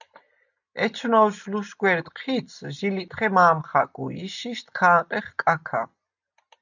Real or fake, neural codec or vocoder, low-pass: real; none; 7.2 kHz